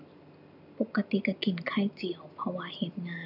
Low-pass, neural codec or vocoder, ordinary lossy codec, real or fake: 5.4 kHz; none; none; real